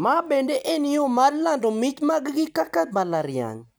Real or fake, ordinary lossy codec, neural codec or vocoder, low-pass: real; none; none; none